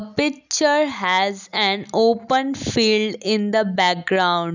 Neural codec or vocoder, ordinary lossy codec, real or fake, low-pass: none; none; real; 7.2 kHz